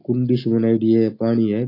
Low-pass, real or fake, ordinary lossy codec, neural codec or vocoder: 5.4 kHz; real; AAC, 32 kbps; none